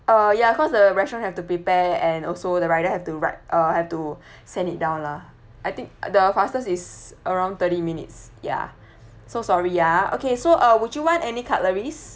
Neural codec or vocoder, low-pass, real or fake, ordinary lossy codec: none; none; real; none